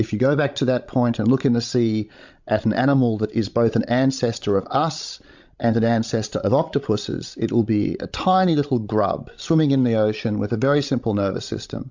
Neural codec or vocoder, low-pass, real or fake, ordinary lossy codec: codec, 16 kHz, 16 kbps, FreqCodec, larger model; 7.2 kHz; fake; MP3, 64 kbps